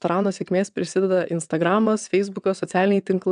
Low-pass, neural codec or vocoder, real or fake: 9.9 kHz; vocoder, 22.05 kHz, 80 mel bands, WaveNeXt; fake